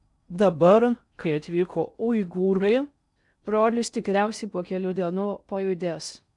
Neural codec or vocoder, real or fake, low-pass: codec, 16 kHz in and 24 kHz out, 0.6 kbps, FocalCodec, streaming, 4096 codes; fake; 10.8 kHz